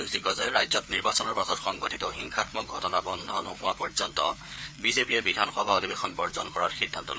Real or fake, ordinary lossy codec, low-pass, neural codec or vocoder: fake; none; none; codec, 16 kHz, 4 kbps, FreqCodec, larger model